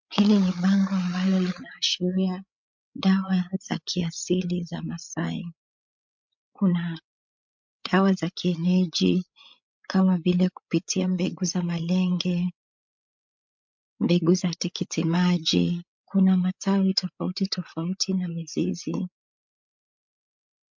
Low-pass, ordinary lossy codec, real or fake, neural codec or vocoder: 7.2 kHz; MP3, 64 kbps; fake; codec, 16 kHz, 8 kbps, FreqCodec, larger model